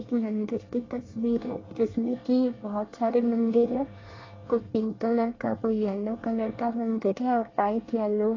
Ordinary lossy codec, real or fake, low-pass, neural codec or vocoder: AAC, 32 kbps; fake; 7.2 kHz; codec, 24 kHz, 1 kbps, SNAC